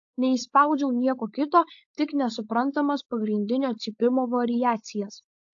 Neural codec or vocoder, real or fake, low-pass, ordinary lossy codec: codec, 16 kHz, 4.8 kbps, FACodec; fake; 7.2 kHz; AAC, 48 kbps